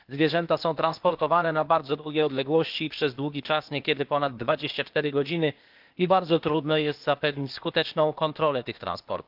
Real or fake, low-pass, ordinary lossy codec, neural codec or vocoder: fake; 5.4 kHz; Opus, 24 kbps; codec, 16 kHz, 0.8 kbps, ZipCodec